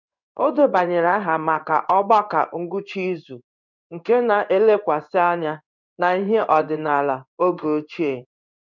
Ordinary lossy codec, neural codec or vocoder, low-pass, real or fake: none; codec, 16 kHz in and 24 kHz out, 1 kbps, XY-Tokenizer; 7.2 kHz; fake